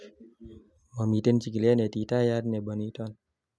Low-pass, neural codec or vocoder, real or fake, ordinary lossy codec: none; none; real; none